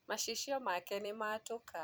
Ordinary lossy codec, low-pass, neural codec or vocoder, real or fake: none; none; vocoder, 44.1 kHz, 128 mel bands every 256 samples, BigVGAN v2; fake